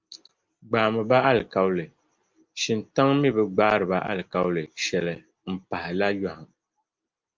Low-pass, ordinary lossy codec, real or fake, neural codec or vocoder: 7.2 kHz; Opus, 32 kbps; real; none